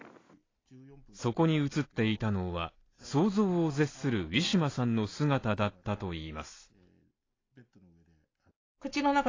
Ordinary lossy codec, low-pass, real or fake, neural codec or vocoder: AAC, 32 kbps; 7.2 kHz; real; none